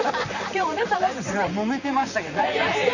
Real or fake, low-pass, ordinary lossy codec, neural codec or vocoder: fake; 7.2 kHz; none; vocoder, 44.1 kHz, 128 mel bands, Pupu-Vocoder